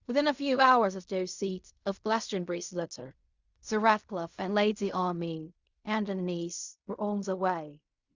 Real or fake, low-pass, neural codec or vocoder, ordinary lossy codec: fake; 7.2 kHz; codec, 16 kHz in and 24 kHz out, 0.4 kbps, LongCat-Audio-Codec, fine tuned four codebook decoder; Opus, 64 kbps